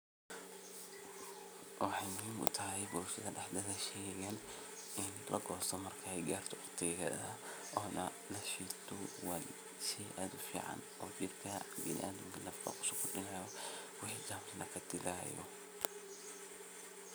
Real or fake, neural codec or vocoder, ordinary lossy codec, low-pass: real; none; none; none